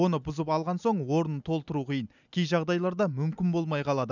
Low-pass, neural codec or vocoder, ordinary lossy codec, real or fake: 7.2 kHz; none; none; real